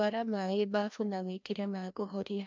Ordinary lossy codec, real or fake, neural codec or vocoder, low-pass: MP3, 64 kbps; fake; codec, 16 kHz, 1 kbps, FreqCodec, larger model; 7.2 kHz